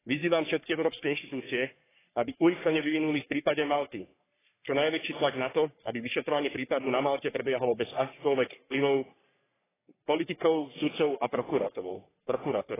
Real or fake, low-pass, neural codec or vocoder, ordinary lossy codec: fake; 3.6 kHz; codec, 44.1 kHz, 3.4 kbps, Pupu-Codec; AAC, 16 kbps